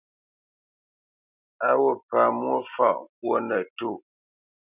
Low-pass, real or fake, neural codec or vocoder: 3.6 kHz; real; none